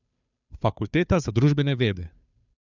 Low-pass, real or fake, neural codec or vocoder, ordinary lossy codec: 7.2 kHz; fake; codec, 16 kHz, 2 kbps, FunCodec, trained on Chinese and English, 25 frames a second; none